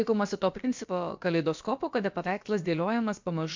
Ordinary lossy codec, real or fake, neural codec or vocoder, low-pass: MP3, 64 kbps; fake; codec, 16 kHz, about 1 kbps, DyCAST, with the encoder's durations; 7.2 kHz